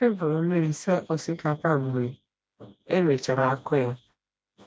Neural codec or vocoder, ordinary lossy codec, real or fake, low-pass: codec, 16 kHz, 1 kbps, FreqCodec, smaller model; none; fake; none